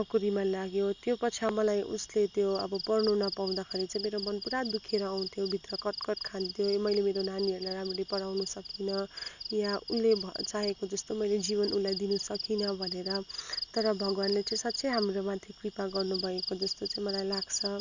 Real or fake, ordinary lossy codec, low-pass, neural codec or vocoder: real; none; 7.2 kHz; none